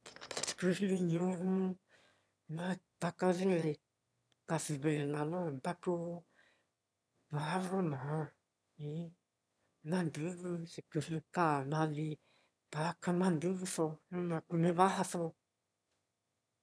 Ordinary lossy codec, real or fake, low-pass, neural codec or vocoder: none; fake; none; autoencoder, 22.05 kHz, a latent of 192 numbers a frame, VITS, trained on one speaker